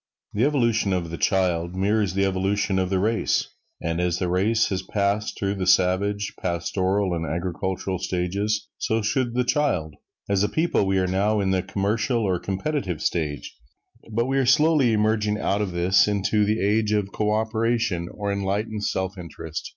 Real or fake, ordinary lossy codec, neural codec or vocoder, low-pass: real; MP3, 64 kbps; none; 7.2 kHz